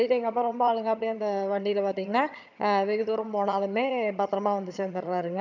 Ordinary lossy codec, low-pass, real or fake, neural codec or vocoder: none; 7.2 kHz; fake; vocoder, 22.05 kHz, 80 mel bands, HiFi-GAN